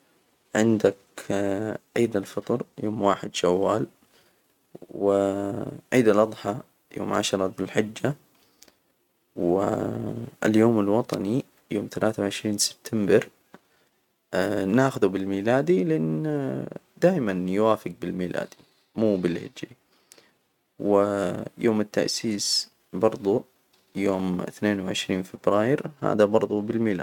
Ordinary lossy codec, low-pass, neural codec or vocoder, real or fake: none; 19.8 kHz; none; real